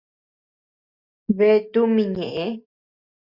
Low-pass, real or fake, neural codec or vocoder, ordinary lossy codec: 5.4 kHz; real; none; Opus, 64 kbps